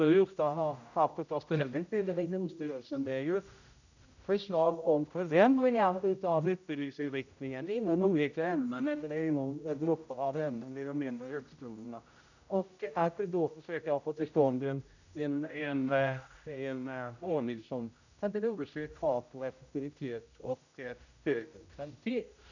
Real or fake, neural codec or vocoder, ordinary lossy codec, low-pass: fake; codec, 16 kHz, 0.5 kbps, X-Codec, HuBERT features, trained on general audio; none; 7.2 kHz